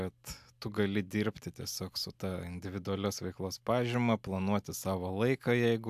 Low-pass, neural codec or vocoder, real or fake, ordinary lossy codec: 14.4 kHz; none; real; AAC, 96 kbps